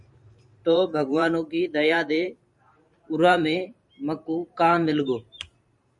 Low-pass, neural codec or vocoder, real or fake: 10.8 kHz; vocoder, 44.1 kHz, 128 mel bands every 512 samples, BigVGAN v2; fake